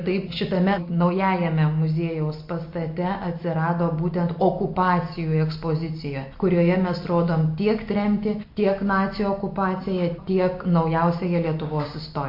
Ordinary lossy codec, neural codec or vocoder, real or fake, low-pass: MP3, 32 kbps; none; real; 5.4 kHz